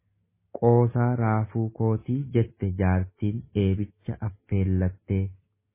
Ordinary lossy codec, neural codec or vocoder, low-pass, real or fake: MP3, 16 kbps; none; 3.6 kHz; real